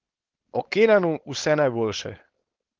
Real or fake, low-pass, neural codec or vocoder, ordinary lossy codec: fake; 7.2 kHz; codec, 16 kHz, 4.8 kbps, FACodec; Opus, 16 kbps